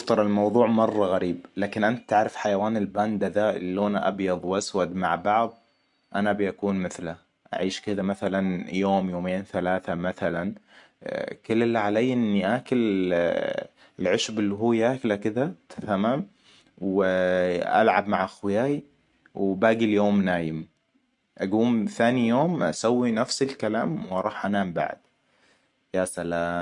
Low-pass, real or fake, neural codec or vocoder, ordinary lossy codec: 10.8 kHz; real; none; MP3, 64 kbps